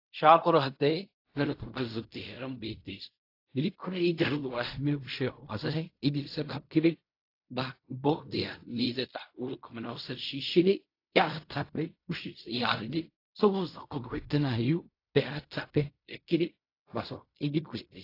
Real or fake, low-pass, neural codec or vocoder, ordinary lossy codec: fake; 5.4 kHz; codec, 16 kHz in and 24 kHz out, 0.4 kbps, LongCat-Audio-Codec, fine tuned four codebook decoder; AAC, 32 kbps